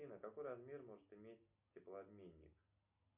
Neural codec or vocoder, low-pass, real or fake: none; 3.6 kHz; real